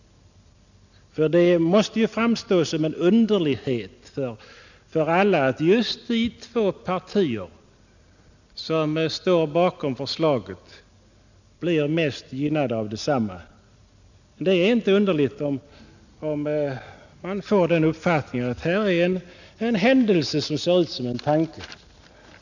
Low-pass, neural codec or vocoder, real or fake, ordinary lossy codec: 7.2 kHz; none; real; none